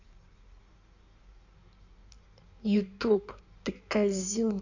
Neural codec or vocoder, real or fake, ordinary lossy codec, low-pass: codec, 16 kHz in and 24 kHz out, 1.1 kbps, FireRedTTS-2 codec; fake; none; 7.2 kHz